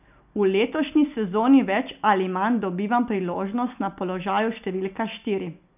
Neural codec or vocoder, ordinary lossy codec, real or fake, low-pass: none; none; real; 3.6 kHz